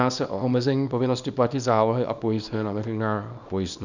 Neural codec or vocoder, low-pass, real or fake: codec, 24 kHz, 0.9 kbps, WavTokenizer, small release; 7.2 kHz; fake